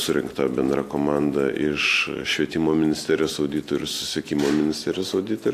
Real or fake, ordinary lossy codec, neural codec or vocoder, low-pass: real; AAC, 64 kbps; none; 14.4 kHz